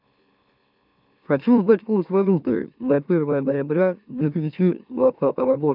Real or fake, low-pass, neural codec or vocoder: fake; 5.4 kHz; autoencoder, 44.1 kHz, a latent of 192 numbers a frame, MeloTTS